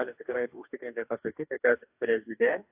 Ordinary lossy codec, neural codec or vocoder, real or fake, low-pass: AAC, 32 kbps; codec, 44.1 kHz, 2.6 kbps, DAC; fake; 3.6 kHz